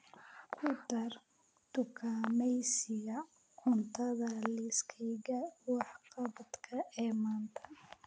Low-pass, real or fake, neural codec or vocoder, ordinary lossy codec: none; real; none; none